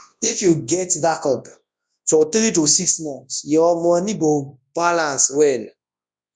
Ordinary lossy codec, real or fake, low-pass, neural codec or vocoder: none; fake; 9.9 kHz; codec, 24 kHz, 0.9 kbps, WavTokenizer, large speech release